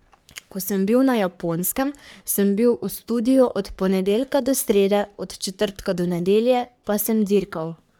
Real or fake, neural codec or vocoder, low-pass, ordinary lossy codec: fake; codec, 44.1 kHz, 3.4 kbps, Pupu-Codec; none; none